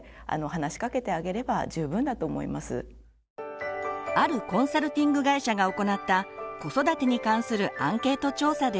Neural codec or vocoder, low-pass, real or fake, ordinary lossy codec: none; none; real; none